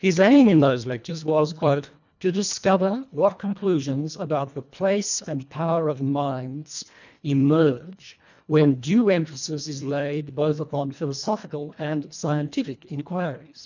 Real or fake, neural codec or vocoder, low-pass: fake; codec, 24 kHz, 1.5 kbps, HILCodec; 7.2 kHz